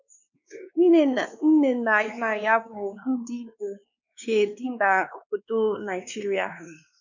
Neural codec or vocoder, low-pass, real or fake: codec, 16 kHz, 2 kbps, X-Codec, WavLM features, trained on Multilingual LibriSpeech; 7.2 kHz; fake